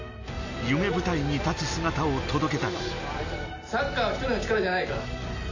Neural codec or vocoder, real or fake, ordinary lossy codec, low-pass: none; real; AAC, 48 kbps; 7.2 kHz